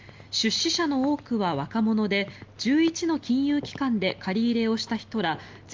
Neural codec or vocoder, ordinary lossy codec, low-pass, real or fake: none; Opus, 32 kbps; 7.2 kHz; real